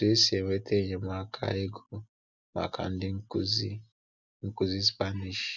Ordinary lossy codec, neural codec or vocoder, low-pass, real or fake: none; none; 7.2 kHz; real